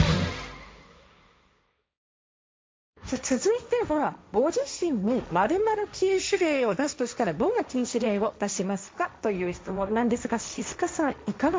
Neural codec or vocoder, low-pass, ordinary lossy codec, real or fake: codec, 16 kHz, 1.1 kbps, Voila-Tokenizer; none; none; fake